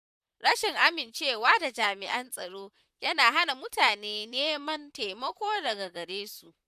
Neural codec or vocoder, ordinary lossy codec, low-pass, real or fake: vocoder, 44.1 kHz, 128 mel bands, Pupu-Vocoder; none; 14.4 kHz; fake